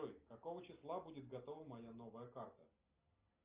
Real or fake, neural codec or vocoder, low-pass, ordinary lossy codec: real; none; 3.6 kHz; Opus, 24 kbps